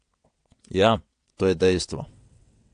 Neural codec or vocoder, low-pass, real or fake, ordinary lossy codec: vocoder, 22.05 kHz, 80 mel bands, WaveNeXt; 9.9 kHz; fake; AAC, 64 kbps